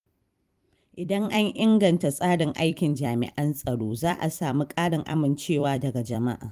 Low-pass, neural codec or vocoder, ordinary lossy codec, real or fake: 14.4 kHz; vocoder, 44.1 kHz, 128 mel bands every 256 samples, BigVGAN v2; Opus, 32 kbps; fake